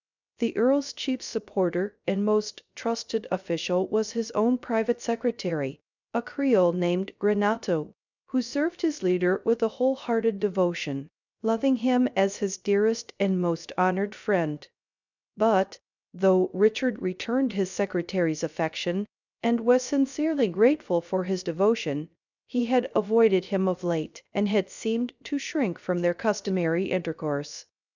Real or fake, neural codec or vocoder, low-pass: fake; codec, 16 kHz, 0.3 kbps, FocalCodec; 7.2 kHz